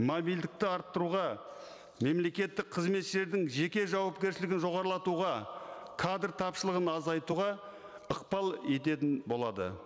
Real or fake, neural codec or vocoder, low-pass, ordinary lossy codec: real; none; none; none